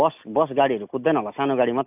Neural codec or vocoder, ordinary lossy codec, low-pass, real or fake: none; AAC, 32 kbps; 3.6 kHz; real